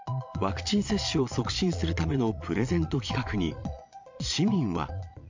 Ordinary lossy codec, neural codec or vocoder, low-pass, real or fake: MP3, 64 kbps; vocoder, 22.05 kHz, 80 mel bands, Vocos; 7.2 kHz; fake